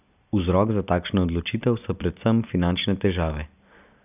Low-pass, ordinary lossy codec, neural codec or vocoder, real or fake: 3.6 kHz; none; none; real